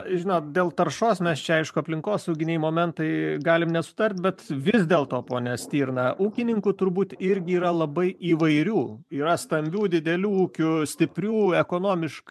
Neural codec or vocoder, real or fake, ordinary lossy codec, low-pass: vocoder, 44.1 kHz, 128 mel bands every 512 samples, BigVGAN v2; fake; MP3, 96 kbps; 14.4 kHz